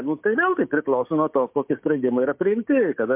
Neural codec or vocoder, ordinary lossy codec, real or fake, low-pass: codec, 16 kHz, 6 kbps, DAC; MP3, 32 kbps; fake; 3.6 kHz